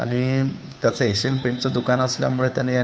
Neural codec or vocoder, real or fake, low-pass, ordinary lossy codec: codec, 16 kHz, 2 kbps, FunCodec, trained on Chinese and English, 25 frames a second; fake; none; none